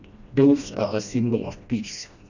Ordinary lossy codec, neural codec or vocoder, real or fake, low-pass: none; codec, 16 kHz, 1 kbps, FreqCodec, smaller model; fake; 7.2 kHz